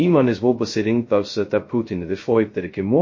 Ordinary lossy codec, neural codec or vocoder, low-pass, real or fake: MP3, 32 kbps; codec, 16 kHz, 0.2 kbps, FocalCodec; 7.2 kHz; fake